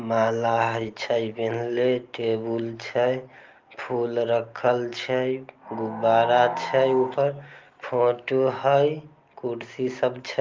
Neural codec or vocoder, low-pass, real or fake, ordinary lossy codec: none; 7.2 kHz; real; Opus, 24 kbps